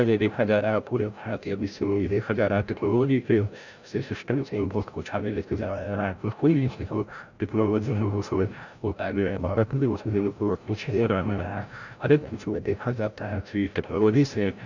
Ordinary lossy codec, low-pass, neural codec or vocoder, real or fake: none; 7.2 kHz; codec, 16 kHz, 0.5 kbps, FreqCodec, larger model; fake